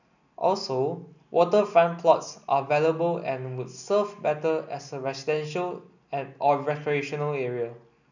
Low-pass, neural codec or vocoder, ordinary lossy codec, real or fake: 7.2 kHz; none; none; real